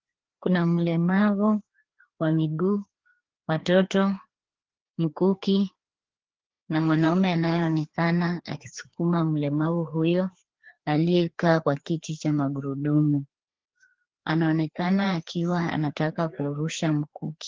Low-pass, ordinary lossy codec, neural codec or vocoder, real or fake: 7.2 kHz; Opus, 16 kbps; codec, 16 kHz, 2 kbps, FreqCodec, larger model; fake